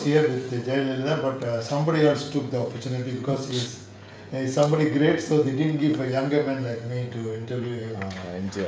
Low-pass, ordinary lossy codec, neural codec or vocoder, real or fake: none; none; codec, 16 kHz, 16 kbps, FreqCodec, smaller model; fake